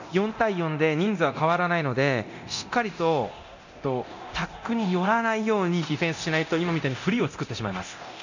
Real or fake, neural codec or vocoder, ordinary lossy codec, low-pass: fake; codec, 24 kHz, 0.9 kbps, DualCodec; none; 7.2 kHz